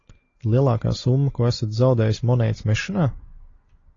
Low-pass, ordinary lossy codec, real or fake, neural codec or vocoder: 7.2 kHz; AAC, 32 kbps; real; none